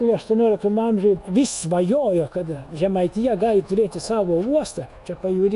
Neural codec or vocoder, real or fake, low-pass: codec, 24 kHz, 1.2 kbps, DualCodec; fake; 10.8 kHz